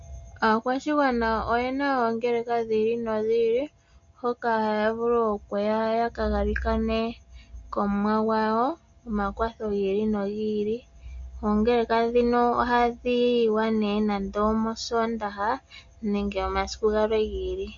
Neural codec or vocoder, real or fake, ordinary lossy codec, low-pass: none; real; MP3, 48 kbps; 7.2 kHz